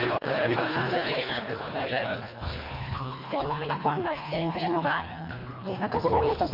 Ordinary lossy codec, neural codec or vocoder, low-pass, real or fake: MP3, 32 kbps; codec, 24 kHz, 1.5 kbps, HILCodec; 5.4 kHz; fake